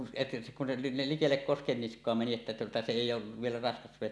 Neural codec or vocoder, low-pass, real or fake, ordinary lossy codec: none; none; real; none